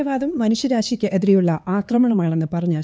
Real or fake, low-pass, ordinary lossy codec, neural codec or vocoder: fake; none; none; codec, 16 kHz, 4 kbps, X-Codec, HuBERT features, trained on LibriSpeech